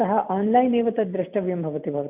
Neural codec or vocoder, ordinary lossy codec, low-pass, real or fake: none; none; 3.6 kHz; real